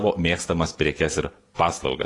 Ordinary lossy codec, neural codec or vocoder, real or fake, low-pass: AAC, 32 kbps; none; real; 10.8 kHz